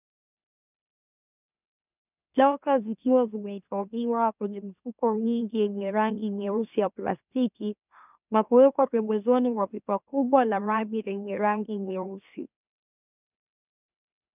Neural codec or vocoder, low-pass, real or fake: autoencoder, 44.1 kHz, a latent of 192 numbers a frame, MeloTTS; 3.6 kHz; fake